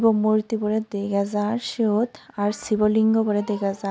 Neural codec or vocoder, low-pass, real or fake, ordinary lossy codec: none; none; real; none